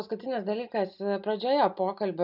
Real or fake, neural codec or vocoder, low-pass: real; none; 5.4 kHz